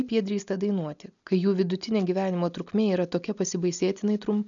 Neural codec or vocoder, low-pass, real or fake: none; 7.2 kHz; real